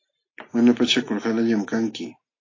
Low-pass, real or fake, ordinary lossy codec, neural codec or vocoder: 7.2 kHz; real; AAC, 32 kbps; none